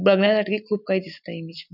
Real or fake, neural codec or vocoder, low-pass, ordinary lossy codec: real; none; 5.4 kHz; none